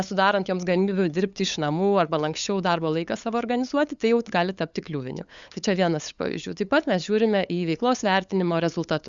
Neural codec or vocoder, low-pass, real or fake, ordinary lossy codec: codec, 16 kHz, 8 kbps, FunCodec, trained on LibriTTS, 25 frames a second; 7.2 kHz; fake; Opus, 64 kbps